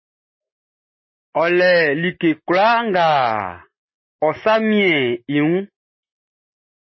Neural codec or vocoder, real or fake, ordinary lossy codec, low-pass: none; real; MP3, 24 kbps; 7.2 kHz